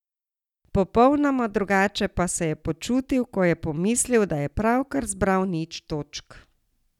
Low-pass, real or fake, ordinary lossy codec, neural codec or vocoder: 19.8 kHz; real; none; none